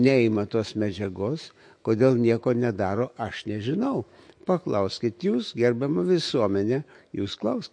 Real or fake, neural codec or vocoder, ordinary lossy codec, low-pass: fake; autoencoder, 48 kHz, 128 numbers a frame, DAC-VAE, trained on Japanese speech; MP3, 48 kbps; 9.9 kHz